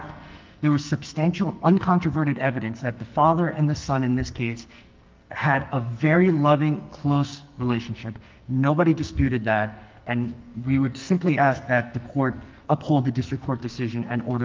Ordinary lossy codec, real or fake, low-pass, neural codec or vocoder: Opus, 24 kbps; fake; 7.2 kHz; codec, 44.1 kHz, 2.6 kbps, SNAC